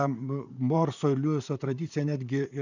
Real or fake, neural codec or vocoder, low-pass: fake; vocoder, 44.1 kHz, 128 mel bands, Pupu-Vocoder; 7.2 kHz